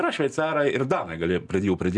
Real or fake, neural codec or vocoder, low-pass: real; none; 10.8 kHz